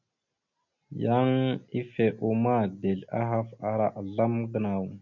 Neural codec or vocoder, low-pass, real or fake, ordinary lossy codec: none; 7.2 kHz; real; MP3, 64 kbps